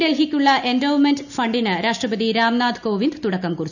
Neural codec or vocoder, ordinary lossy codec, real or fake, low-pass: none; none; real; 7.2 kHz